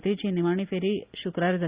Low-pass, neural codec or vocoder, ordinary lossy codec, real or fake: 3.6 kHz; none; Opus, 64 kbps; real